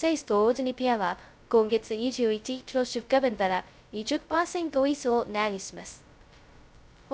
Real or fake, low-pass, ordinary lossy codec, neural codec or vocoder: fake; none; none; codec, 16 kHz, 0.2 kbps, FocalCodec